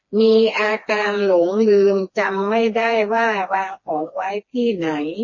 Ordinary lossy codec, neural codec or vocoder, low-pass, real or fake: MP3, 32 kbps; codec, 16 kHz, 2 kbps, FreqCodec, smaller model; 7.2 kHz; fake